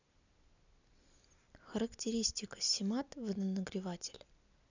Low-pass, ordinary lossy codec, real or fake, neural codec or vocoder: 7.2 kHz; none; real; none